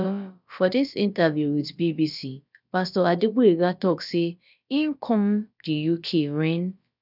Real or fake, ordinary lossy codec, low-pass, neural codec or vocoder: fake; none; 5.4 kHz; codec, 16 kHz, about 1 kbps, DyCAST, with the encoder's durations